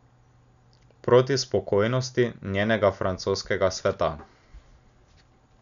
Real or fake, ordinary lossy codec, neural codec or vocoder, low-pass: real; none; none; 7.2 kHz